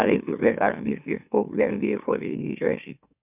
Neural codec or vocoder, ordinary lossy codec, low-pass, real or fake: autoencoder, 44.1 kHz, a latent of 192 numbers a frame, MeloTTS; none; 3.6 kHz; fake